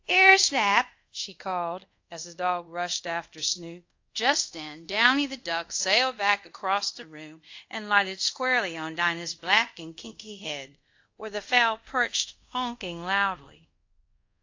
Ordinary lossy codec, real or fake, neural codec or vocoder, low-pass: AAC, 48 kbps; fake; codec, 24 kHz, 0.5 kbps, DualCodec; 7.2 kHz